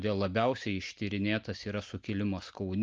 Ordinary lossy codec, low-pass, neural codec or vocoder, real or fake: Opus, 24 kbps; 7.2 kHz; none; real